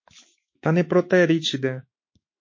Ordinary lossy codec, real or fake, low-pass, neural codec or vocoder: MP3, 32 kbps; fake; 7.2 kHz; autoencoder, 48 kHz, 128 numbers a frame, DAC-VAE, trained on Japanese speech